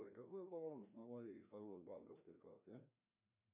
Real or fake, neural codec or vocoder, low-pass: fake; codec, 16 kHz, 1 kbps, FreqCodec, larger model; 3.6 kHz